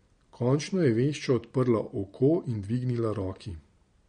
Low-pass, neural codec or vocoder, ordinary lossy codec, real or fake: 9.9 kHz; none; MP3, 48 kbps; real